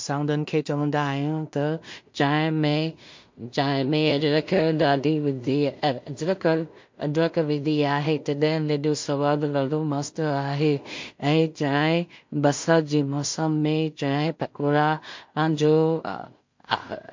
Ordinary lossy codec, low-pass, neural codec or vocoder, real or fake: MP3, 48 kbps; 7.2 kHz; codec, 16 kHz in and 24 kHz out, 0.4 kbps, LongCat-Audio-Codec, two codebook decoder; fake